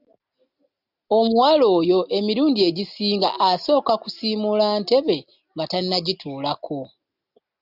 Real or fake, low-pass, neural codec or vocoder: real; 5.4 kHz; none